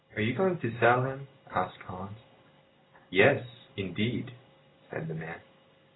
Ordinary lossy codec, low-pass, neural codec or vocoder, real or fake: AAC, 16 kbps; 7.2 kHz; none; real